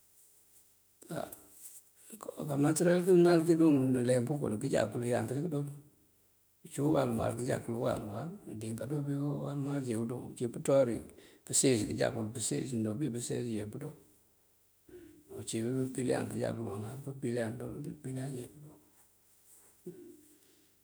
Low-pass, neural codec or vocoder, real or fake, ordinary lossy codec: none; autoencoder, 48 kHz, 32 numbers a frame, DAC-VAE, trained on Japanese speech; fake; none